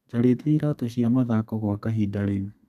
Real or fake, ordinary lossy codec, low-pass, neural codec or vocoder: fake; none; 14.4 kHz; codec, 32 kHz, 1.9 kbps, SNAC